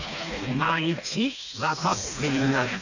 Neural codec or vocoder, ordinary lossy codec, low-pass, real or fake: codec, 16 kHz, 2 kbps, FreqCodec, smaller model; none; 7.2 kHz; fake